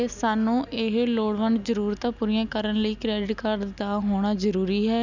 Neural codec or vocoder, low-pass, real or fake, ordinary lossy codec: none; 7.2 kHz; real; none